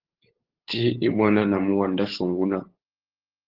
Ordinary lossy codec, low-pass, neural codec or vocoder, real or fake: Opus, 16 kbps; 5.4 kHz; codec, 16 kHz, 8 kbps, FunCodec, trained on LibriTTS, 25 frames a second; fake